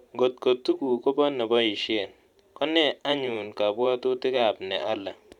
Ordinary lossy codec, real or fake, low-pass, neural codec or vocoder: none; fake; 19.8 kHz; vocoder, 44.1 kHz, 128 mel bands every 512 samples, BigVGAN v2